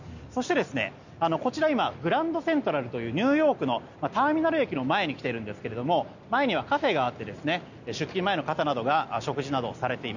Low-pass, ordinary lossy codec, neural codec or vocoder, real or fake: 7.2 kHz; none; none; real